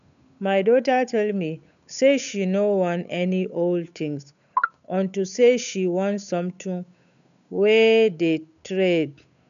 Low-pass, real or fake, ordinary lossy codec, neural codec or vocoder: 7.2 kHz; fake; none; codec, 16 kHz, 8 kbps, FunCodec, trained on Chinese and English, 25 frames a second